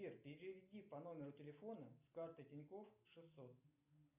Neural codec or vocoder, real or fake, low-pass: none; real; 3.6 kHz